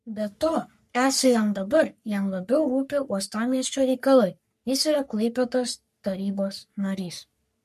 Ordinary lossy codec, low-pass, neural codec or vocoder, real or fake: MP3, 64 kbps; 14.4 kHz; codec, 44.1 kHz, 3.4 kbps, Pupu-Codec; fake